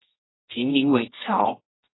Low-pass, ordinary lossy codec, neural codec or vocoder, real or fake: 7.2 kHz; AAC, 16 kbps; codec, 24 kHz, 0.9 kbps, WavTokenizer, medium music audio release; fake